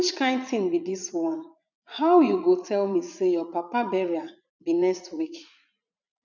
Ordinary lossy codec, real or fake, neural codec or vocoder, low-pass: none; fake; vocoder, 44.1 kHz, 80 mel bands, Vocos; 7.2 kHz